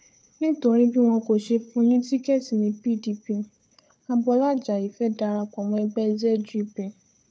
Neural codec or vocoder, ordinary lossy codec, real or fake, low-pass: codec, 16 kHz, 4 kbps, FunCodec, trained on Chinese and English, 50 frames a second; none; fake; none